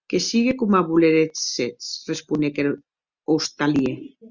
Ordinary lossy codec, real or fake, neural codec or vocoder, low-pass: Opus, 64 kbps; real; none; 7.2 kHz